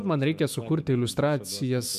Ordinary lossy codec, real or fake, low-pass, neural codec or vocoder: MP3, 96 kbps; fake; 14.4 kHz; autoencoder, 48 kHz, 128 numbers a frame, DAC-VAE, trained on Japanese speech